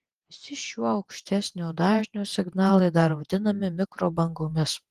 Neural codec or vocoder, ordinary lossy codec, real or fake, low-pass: vocoder, 48 kHz, 128 mel bands, Vocos; Opus, 24 kbps; fake; 14.4 kHz